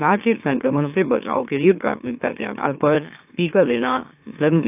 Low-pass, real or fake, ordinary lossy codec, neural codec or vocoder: 3.6 kHz; fake; AAC, 32 kbps; autoencoder, 44.1 kHz, a latent of 192 numbers a frame, MeloTTS